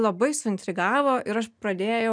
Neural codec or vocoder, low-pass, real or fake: none; 9.9 kHz; real